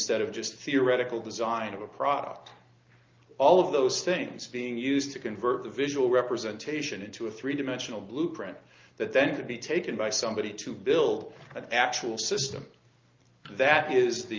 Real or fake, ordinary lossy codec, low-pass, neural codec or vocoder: real; Opus, 24 kbps; 7.2 kHz; none